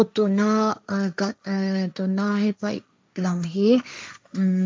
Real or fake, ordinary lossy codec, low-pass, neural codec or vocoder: fake; none; none; codec, 16 kHz, 1.1 kbps, Voila-Tokenizer